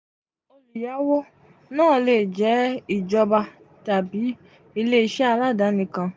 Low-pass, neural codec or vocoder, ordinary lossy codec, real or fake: none; none; none; real